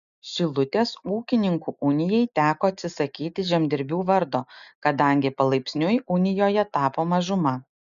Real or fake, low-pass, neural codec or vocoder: real; 7.2 kHz; none